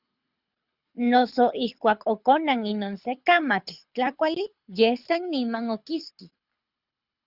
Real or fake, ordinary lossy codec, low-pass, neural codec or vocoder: fake; Opus, 64 kbps; 5.4 kHz; codec, 24 kHz, 6 kbps, HILCodec